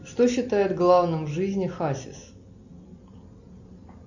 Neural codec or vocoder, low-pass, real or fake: none; 7.2 kHz; real